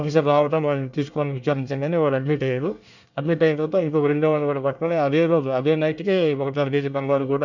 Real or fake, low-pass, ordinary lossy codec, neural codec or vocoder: fake; 7.2 kHz; none; codec, 24 kHz, 1 kbps, SNAC